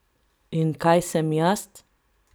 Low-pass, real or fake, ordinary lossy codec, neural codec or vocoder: none; real; none; none